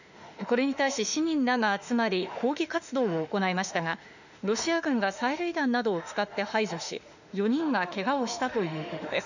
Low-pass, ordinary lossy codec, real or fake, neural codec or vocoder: 7.2 kHz; none; fake; autoencoder, 48 kHz, 32 numbers a frame, DAC-VAE, trained on Japanese speech